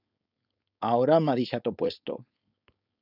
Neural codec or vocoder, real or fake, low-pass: codec, 16 kHz, 4.8 kbps, FACodec; fake; 5.4 kHz